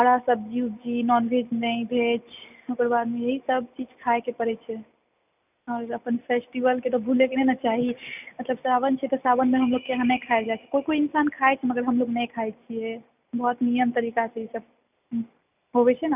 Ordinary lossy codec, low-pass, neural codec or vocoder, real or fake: none; 3.6 kHz; none; real